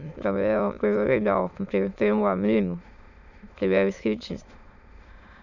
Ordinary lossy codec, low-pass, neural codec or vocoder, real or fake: none; 7.2 kHz; autoencoder, 22.05 kHz, a latent of 192 numbers a frame, VITS, trained on many speakers; fake